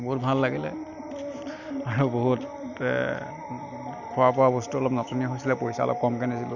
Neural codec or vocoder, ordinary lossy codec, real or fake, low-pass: none; none; real; 7.2 kHz